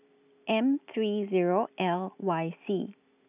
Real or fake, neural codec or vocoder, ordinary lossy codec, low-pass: real; none; none; 3.6 kHz